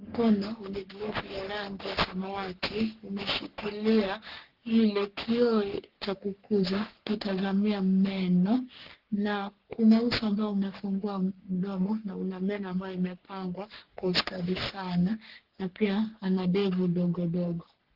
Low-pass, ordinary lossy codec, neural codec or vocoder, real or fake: 5.4 kHz; Opus, 16 kbps; codec, 44.1 kHz, 3.4 kbps, Pupu-Codec; fake